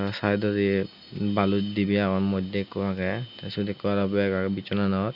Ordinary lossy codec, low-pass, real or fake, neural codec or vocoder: none; 5.4 kHz; real; none